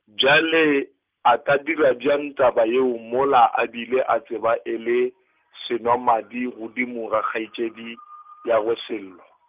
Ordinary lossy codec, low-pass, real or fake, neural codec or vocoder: Opus, 32 kbps; 3.6 kHz; real; none